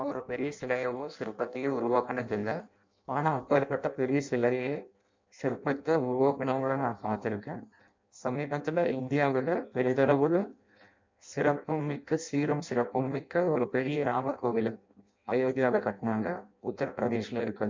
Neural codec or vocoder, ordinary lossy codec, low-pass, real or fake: codec, 16 kHz in and 24 kHz out, 0.6 kbps, FireRedTTS-2 codec; MP3, 64 kbps; 7.2 kHz; fake